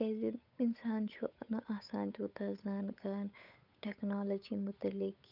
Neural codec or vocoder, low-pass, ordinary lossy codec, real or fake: codec, 16 kHz, 8 kbps, FunCodec, trained on Chinese and English, 25 frames a second; 5.4 kHz; none; fake